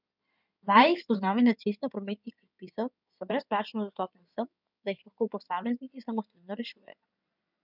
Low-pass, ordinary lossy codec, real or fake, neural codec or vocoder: 5.4 kHz; none; fake; codec, 16 kHz in and 24 kHz out, 2.2 kbps, FireRedTTS-2 codec